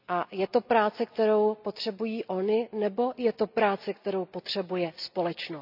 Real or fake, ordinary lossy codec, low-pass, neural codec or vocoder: real; none; 5.4 kHz; none